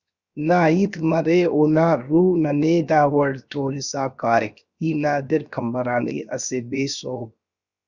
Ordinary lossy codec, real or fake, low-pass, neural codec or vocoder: Opus, 64 kbps; fake; 7.2 kHz; codec, 16 kHz, 0.7 kbps, FocalCodec